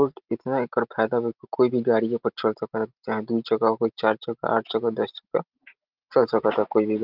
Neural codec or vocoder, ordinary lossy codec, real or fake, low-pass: none; Opus, 32 kbps; real; 5.4 kHz